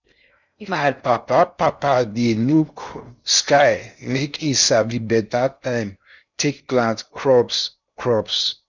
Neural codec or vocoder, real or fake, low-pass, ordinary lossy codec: codec, 16 kHz in and 24 kHz out, 0.6 kbps, FocalCodec, streaming, 4096 codes; fake; 7.2 kHz; none